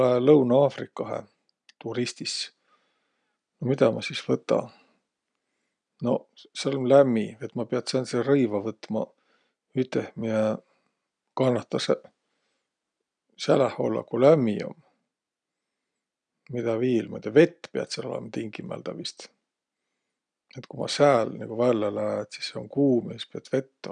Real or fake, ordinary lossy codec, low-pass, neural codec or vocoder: real; none; 9.9 kHz; none